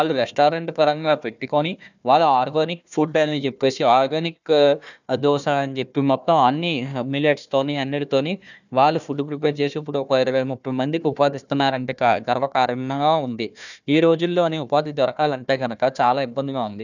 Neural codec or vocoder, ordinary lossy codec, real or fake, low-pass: codec, 16 kHz, 1 kbps, FunCodec, trained on Chinese and English, 50 frames a second; none; fake; 7.2 kHz